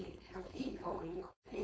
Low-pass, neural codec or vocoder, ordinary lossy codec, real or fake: none; codec, 16 kHz, 4.8 kbps, FACodec; none; fake